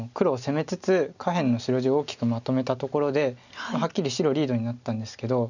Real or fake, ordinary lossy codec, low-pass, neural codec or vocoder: real; none; 7.2 kHz; none